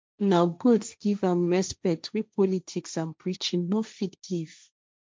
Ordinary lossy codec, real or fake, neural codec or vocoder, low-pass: none; fake; codec, 16 kHz, 1.1 kbps, Voila-Tokenizer; none